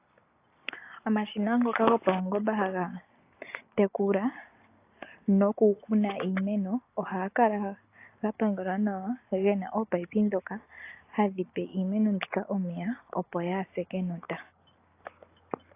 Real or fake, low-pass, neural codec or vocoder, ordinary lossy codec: real; 3.6 kHz; none; AAC, 24 kbps